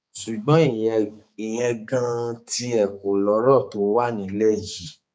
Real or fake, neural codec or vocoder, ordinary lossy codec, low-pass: fake; codec, 16 kHz, 4 kbps, X-Codec, HuBERT features, trained on balanced general audio; none; none